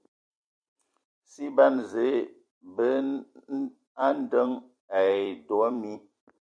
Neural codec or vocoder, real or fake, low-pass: vocoder, 44.1 kHz, 128 mel bands every 256 samples, BigVGAN v2; fake; 9.9 kHz